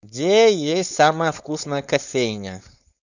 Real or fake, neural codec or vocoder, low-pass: fake; codec, 16 kHz, 4.8 kbps, FACodec; 7.2 kHz